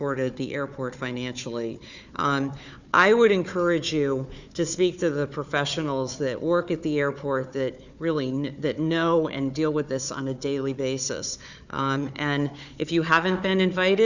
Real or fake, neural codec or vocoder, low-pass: fake; codec, 16 kHz, 4 kbps, FunCodec, trained on Chinese and English, 50 frames a second; 7.2 kHz